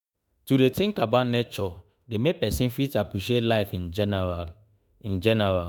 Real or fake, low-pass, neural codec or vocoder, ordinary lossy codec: fake; none; autoencoder, 48 kHz, 32 numbers a frame, DAC-VAE, trained on Japanese speech; none